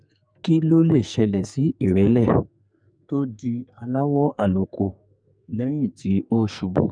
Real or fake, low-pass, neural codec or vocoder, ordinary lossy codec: fake; 9.9 kHz; codec, 44.1 kHz, 2.6 kbps, SNAC; none